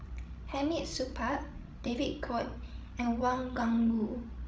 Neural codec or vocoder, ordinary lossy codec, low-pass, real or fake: codec, 16 kHz, 16 kbps, FreqCodec, larger model; none; none; fake